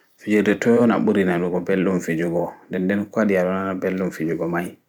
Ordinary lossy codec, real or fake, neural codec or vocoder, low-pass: none; fake; vocoder, 44.1 kHz, 128 mel bands every 256 samples, BigVGAN v2; 19.8 kHz